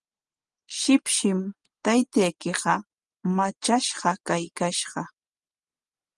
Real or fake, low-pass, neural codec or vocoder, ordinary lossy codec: real; 10.8 kHz; none; Opus, 24 kbps